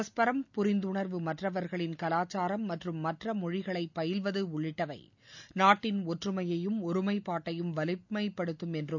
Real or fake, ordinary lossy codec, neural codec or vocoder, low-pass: real; none; none; 7.2 kHz